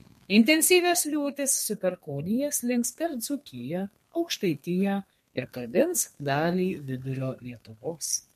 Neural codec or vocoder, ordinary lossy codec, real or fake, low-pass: codec, 32 kHz, 1.9 kbps, SNAC; MP3, 64 kbps; fake; 14.4 kHz